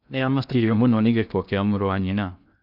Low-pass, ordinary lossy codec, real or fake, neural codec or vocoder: 5.4 kHz; none; fake; codec, 16 kHz in and 24 kHz out, 0.6 kbps, FocalCodec, streaming, 2048 codes